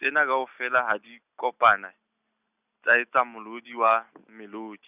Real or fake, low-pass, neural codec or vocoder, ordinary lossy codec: real; 3.6 kHz; none; none